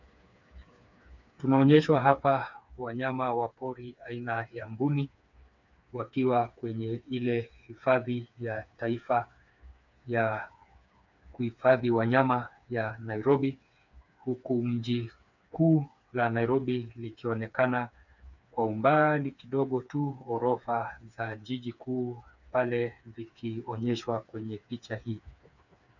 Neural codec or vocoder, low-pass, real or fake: codec, 16 kHz, 4 kbps, FreqCodec, smaller model; 7.2 kHz; fake